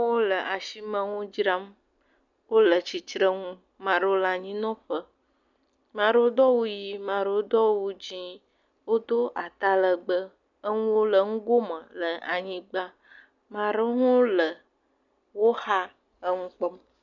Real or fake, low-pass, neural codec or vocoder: real; 7.2 kHz; none